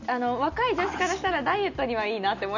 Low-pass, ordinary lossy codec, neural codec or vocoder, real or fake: 7.2 kHz; none; none; real